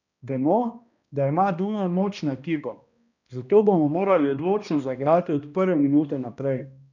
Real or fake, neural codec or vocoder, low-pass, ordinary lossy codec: fake; codec, 16 kHz, 1 kbps, X-Codec, HuBERT features, trained on balanced general audio; 7.2 kHz; none